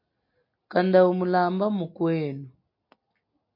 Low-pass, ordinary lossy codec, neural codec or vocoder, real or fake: 5.4 kHz; MP3, 32 kbps; none; real